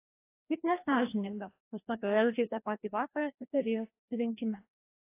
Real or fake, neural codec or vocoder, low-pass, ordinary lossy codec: fake; codec, 16 kHz, 1 kbps, FreqCodec, larger model; 3.6 kHz; AAC, 24 kbps